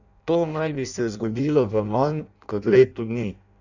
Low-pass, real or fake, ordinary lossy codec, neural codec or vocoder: 7.2 kHz; fake; none; codec, 16 kHz in and 24 kHz out, 0.6 kbps, FireRedTTS-2 codec